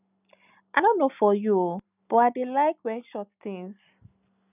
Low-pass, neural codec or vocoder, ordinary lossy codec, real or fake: 3.6 kHz; none; none; real